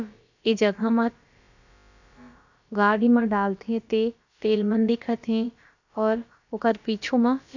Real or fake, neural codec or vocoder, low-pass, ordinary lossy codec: fake; codec, 16 kHz, about 1 kbps, DyCAST, with the encoder's durations; 7.2 kHz; none